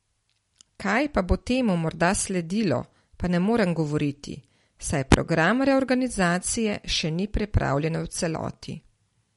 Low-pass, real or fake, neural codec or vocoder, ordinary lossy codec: 14.4 kHz; real; none; MP3, 48 kbps